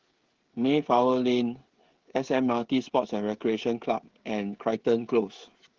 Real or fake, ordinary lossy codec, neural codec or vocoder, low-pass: fake; Opus, 16 kbps; codec, 16 kHz, 16 kbps, FreqCodec, smaller model; 7.2 kHz